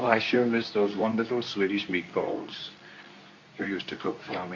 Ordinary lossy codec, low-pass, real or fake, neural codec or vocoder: MP3, 48 kbps; 7.2 kHz; fake; codec, 24 kHz, 0.9 kbps, WavTokenizer, medium speech release version 2